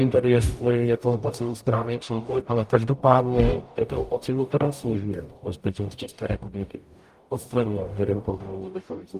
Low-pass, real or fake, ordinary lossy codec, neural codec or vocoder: 14.4 kHz; fake; Opus, 32 kbps; codec, 44.1 kHz, 0.9 kbps, DAC